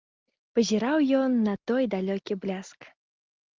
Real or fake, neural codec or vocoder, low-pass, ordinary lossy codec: real; none; 7.2 kHz; Opus, 32 kbps